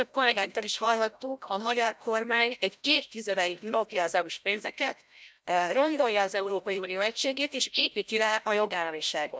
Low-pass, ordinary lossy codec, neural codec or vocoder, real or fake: none; none; codec, 16 kHz, 0.5 kbps, FreqCodec, larger model; fake